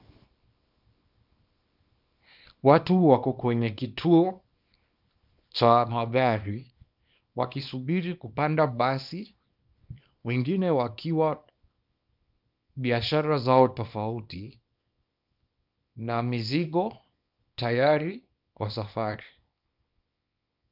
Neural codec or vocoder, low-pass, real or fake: codec, 24 kHz, 0.9 kbps, WavTokenizer, small release; 5.4 kHz; fake